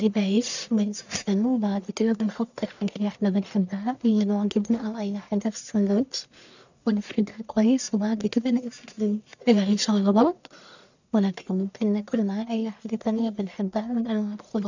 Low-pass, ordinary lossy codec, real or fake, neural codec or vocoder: 7.2 kHz; none; fake; codec, 44.1 kHz, 1.7 kbps, Pupu-Codec